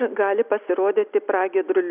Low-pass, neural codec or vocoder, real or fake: 3.6 kHz; none; real